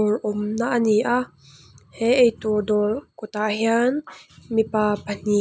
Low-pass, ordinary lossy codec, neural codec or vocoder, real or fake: none; none; none; real